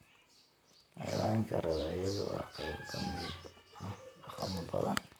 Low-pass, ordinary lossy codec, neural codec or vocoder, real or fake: none; none; vocoder, 44.1 kHz, 128 mel bands, Pupu-Vocoder; fake